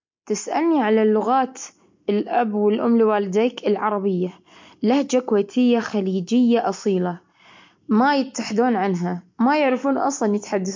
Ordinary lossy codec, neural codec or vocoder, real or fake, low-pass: MP3, 48 kbps; none; real; 7.2 kHz